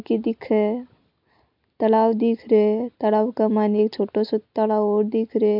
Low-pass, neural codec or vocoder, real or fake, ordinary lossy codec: 5.4 kHz; none; real; MP3, 48 kbps